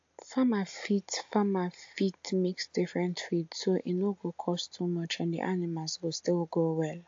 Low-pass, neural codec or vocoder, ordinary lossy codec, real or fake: 7.2 kHz; none; AAC, 48 kbps; real